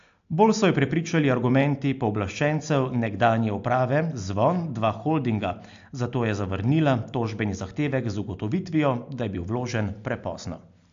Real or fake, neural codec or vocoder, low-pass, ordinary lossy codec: real; none; 7.2 kHz; AAC, 64 kbps